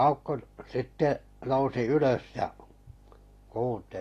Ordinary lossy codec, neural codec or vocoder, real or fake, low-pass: AAC, 48 kbps; none; real; 14.4 kHz